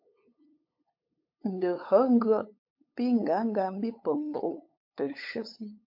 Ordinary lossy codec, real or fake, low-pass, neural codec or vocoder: MP3, 32 kbps; fake; 5.4 kHz; codec, 16 kHz, 8 kbps, FunCodec, trained on LibriTTS, 25 frames a second